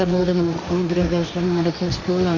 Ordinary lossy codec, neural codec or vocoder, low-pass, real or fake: none; codec, 16 kHz, 1.1 kbps, Voila-Tokenizer; 7.2 kHz; fake